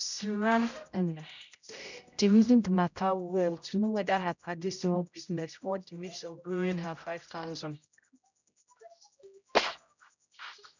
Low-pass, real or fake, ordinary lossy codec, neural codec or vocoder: 7.2 kHz; fake; none; codec, 16 kHz, 0.5 kbps, X-Codec, HuBERT features, trained on general audio